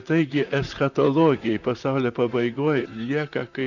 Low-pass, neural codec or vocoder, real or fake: 7.2 kHz; vocoder, 44.1 kHz, 128 mel bands, Pupu-Vocoder; fake